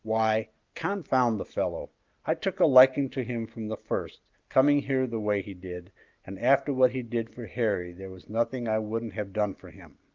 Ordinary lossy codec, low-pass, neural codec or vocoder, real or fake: Opus, 32 kbps; 7.2 kHz; none; real